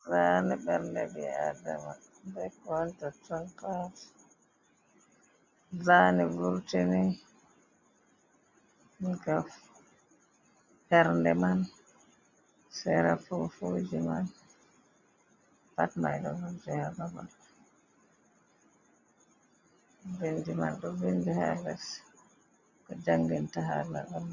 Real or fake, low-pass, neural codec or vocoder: real; 7.2 kHz; none